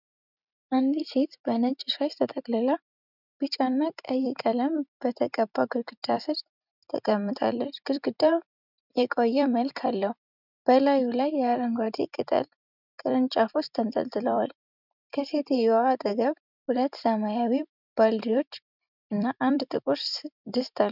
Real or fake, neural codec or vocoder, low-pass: fake; vocoder, 44.1 kHz, 128 mel bands every 256 samples, BigVGAN v2; 5.4 kHz